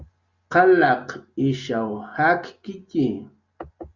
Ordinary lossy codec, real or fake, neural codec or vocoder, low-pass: Opus, 64 kbps; real; none; 7.2 kHz